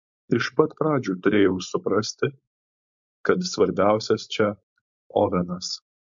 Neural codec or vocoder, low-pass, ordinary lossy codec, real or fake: codec, 16 kHz, 4.8 kbps, FACodec; 7.2 kHz; MP3, 64 kbps; fake